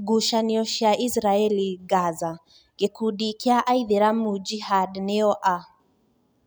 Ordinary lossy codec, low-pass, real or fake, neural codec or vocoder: none; none; real; none